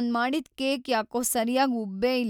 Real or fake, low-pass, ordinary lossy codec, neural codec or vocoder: real; 19.8 kHz; none; none